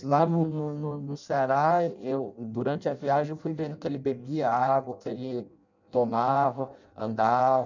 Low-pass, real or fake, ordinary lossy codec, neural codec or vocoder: 7.2 kHz; fake; none; codec, 16 kHz in and 24 kHz out, 0.6 kbps, FireRedTTS-2 codec